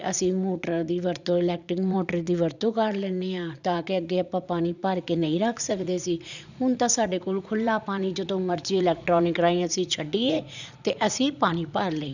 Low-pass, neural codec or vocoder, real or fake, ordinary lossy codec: 7.2 kHz; vocoder, 44.1 kHz, 80 mel bands, Vocos; fake; none